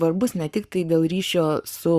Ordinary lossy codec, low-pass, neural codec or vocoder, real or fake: Opus, 64 kbps; 14.4 kHz; codec, 44.1 kHz, 7.8 kbps, Pupu-Codec; fake